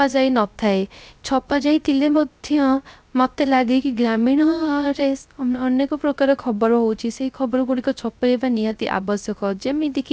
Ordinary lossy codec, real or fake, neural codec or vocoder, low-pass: none; fake; codec, 16 kHz, 0.3 kbps, FocalCodec; none